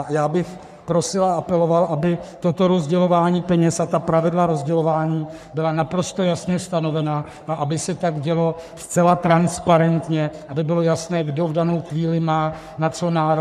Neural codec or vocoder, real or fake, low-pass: codec, 44.1 kHz, 3.4 kbps, Pupu-Codec; fake; 14.4 kHz